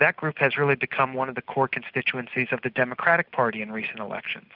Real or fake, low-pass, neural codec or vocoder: real; 5.4 kHz; none